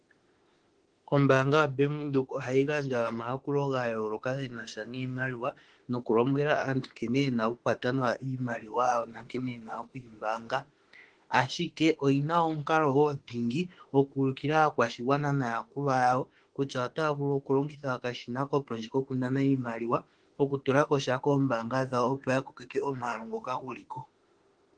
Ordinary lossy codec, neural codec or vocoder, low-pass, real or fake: Opus, 16 kbps; autoencoder, 48 kHz, 32 numbers a frame, DAC-VAE, trained on Japanese speech; 9.9 kHz; fake